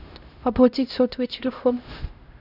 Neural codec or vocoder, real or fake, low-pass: codec, 16 kHz, 0.5 kbps, X-Codec, HuBERT features, trained on LibriSpeech; fake; 5.4 kHz